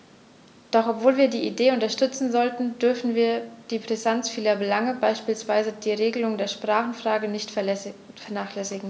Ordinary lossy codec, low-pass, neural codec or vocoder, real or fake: none; none; none; real